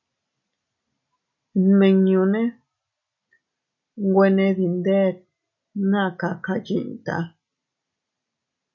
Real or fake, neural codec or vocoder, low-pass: real; none; 7.2 kHz